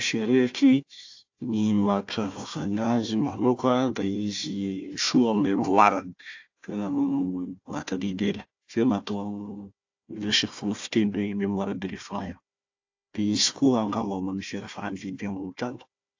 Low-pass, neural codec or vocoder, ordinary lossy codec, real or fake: 7.2 kHz; codec, 16 kHz, 1 kbps, FunCodec, trained on Chinese and English, 50 frames a second; AAC, 48 kbps; fake